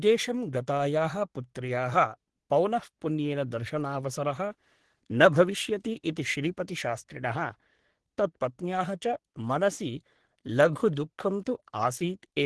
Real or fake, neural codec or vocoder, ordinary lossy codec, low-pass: fake; codec, 44.1 kHz, 3.4 kbps, Pupu-Codec; Opus, 16 kbps; 10.8 kHz